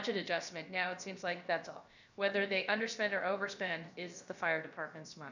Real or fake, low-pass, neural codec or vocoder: fake; 7.2 kHz; codec, 16 kHz, about 1 kbps, DyCAST, with the encoder's durations